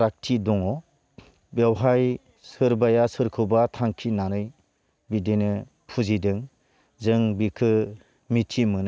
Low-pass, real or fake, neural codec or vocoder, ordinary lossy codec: none; real; none; none